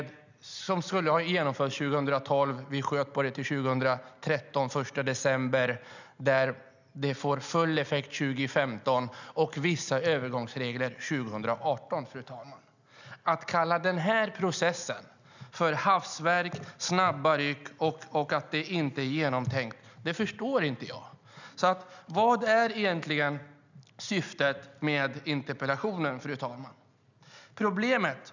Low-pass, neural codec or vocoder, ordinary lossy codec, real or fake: 7.2 kHz; none; none; real